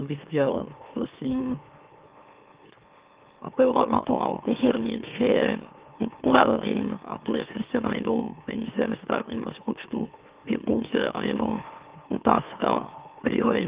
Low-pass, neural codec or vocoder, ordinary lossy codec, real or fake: 3.6 kHz; autoencoder, 44.1 kHz, a latent of 192 numbers a frame, MeloTTS; Opus, 32 kbps; fake